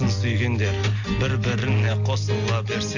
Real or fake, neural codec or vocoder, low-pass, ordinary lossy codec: real; none; 7.2 kHz; none